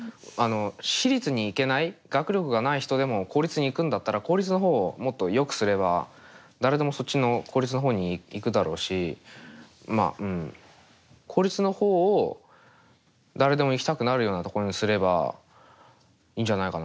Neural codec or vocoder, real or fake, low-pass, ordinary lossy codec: none; real; none; none